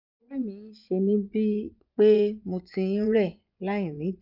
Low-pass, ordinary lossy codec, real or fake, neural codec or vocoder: 5.4 kHz; none; fake; vocoder, 24 kHz, 100 mel bands, Vocos